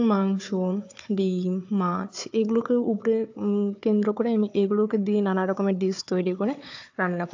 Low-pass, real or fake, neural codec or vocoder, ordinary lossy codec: 7.2 kHz; fake; codec, 16 kHz, 4 kbps, FunCodec, trained on Chinese and English, 50 frames a second; AAC, 48 kbps